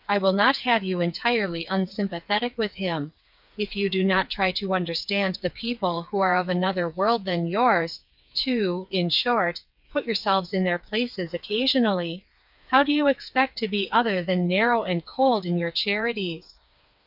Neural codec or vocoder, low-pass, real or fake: codec, 16 kHz, 4 kbps, FreqCodec, smaller model; 5.4 kHz; fake